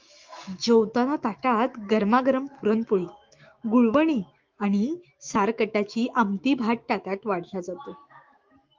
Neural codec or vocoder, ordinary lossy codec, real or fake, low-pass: autoencoder, 48 kHz, 128 numbers a frame, DAC-VAE, trained on Japanese speech; Opus, 32 kbps; fake; 7.2 kHz